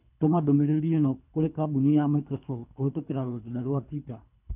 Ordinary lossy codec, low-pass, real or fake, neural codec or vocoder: none; 3.6 kHz; fake; codec, 24 kHz, 3 kbps, HILCodec